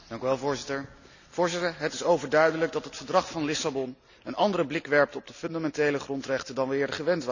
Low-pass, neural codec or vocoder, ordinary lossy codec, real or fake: 7.2 kHz; none; none; real